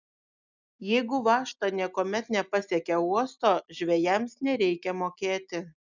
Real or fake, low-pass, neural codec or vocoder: real; 7.2 kHz; none